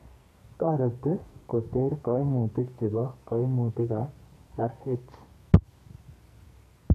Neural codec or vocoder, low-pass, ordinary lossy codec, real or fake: codec, 44.1 kHz, 2.6 kbps, SNAC; 14.4 kHz; AAC, 64 kbps; fake